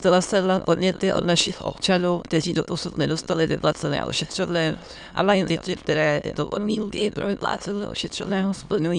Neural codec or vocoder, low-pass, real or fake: autoencoder, 22.05 kHz, a latent of 192 numbers a frame, VITS, trained on many speakers; 9.9 kHz; fake